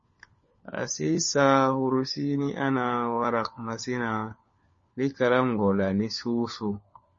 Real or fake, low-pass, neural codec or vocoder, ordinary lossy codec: fake; 7.2 kHz; codec, 16 kHz, 4 kbps, FunCodec, trained on LibriTTS, 50 frames a second; MP3, 32 kbps